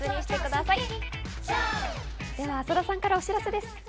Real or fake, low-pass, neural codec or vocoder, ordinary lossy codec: real; none; none; none